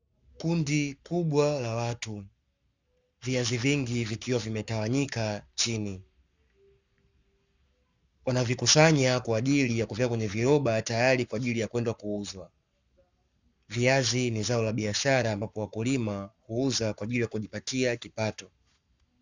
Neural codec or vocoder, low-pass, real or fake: codec, 44.1 kHz, 7.8 kbps, Pupu-Codec; 7.2 kHz; fake